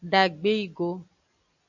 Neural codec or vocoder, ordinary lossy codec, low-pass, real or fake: none; AAC, 48 kbps; 7.2 kHz; real